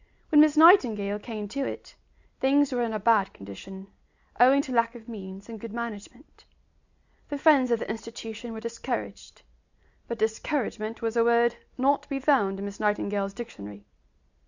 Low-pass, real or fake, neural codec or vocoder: 7.2 kHz; real; none